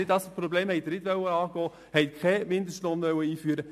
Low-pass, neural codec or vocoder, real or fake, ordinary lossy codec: 14.4 kHz; none; real; AAC, 96 kbps